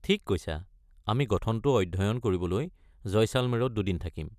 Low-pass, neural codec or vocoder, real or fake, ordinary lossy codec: 14.4 kHz; none; real; none